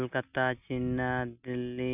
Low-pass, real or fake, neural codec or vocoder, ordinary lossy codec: 3.6 kHz; real; none; none